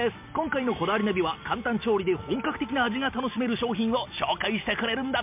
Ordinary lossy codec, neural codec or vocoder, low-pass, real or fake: MP3, 32 kbps; none; 3.6 kHz; real